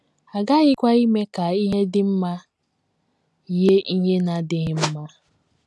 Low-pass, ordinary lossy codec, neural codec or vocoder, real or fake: none; none; none; real